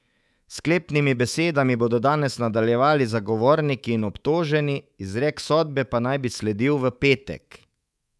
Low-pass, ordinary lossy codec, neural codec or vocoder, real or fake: none; none; codec, 24 kHz, 3.1 kbps, DualCodec; fake